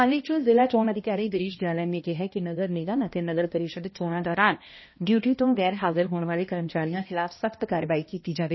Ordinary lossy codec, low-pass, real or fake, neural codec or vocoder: MP3, 24 kbps; 7.2 kHz; fake; codec, 16 kHz, 1 kbps, X-Codec, HuBERT features, trained on balanced general audio